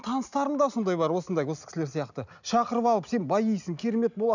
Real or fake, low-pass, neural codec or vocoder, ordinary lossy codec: real; 7.2 kHz; none; none